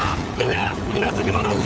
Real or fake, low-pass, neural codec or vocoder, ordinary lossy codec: fake; none; codec, 16 kHz, 4 kbps, FunCodec, trained on LibriTTS, 50 frames a second; none